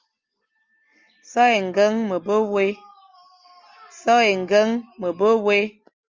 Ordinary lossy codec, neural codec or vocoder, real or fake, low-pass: Opus, 32 kbps; none; real; 7.2 kHz